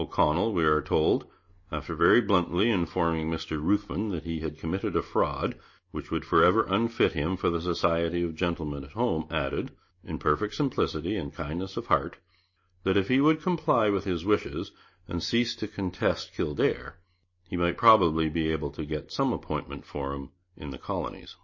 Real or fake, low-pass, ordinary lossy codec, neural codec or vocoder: real; 7.2 kHz; MP3, 32 kbps; none